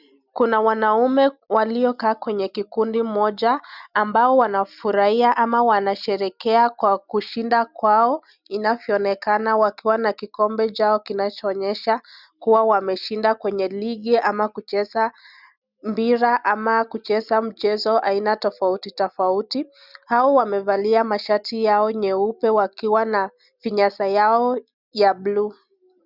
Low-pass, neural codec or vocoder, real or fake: 5.4 kHz; none; real